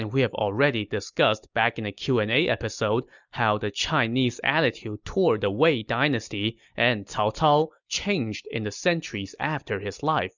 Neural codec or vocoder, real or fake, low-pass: none; real; 7.2 kHz